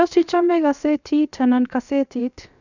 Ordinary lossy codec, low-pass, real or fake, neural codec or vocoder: none; 7.2 kHz; fake; codec, 16 kHz, 0.7 kbps, FocalCodec